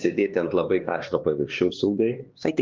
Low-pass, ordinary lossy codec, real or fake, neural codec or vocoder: 7.2 kHz; Opus, 32 kbps; fake; codec, 16 kHz, 2 kbps, X-Codec, HuBERT features, trained on LibriSpeech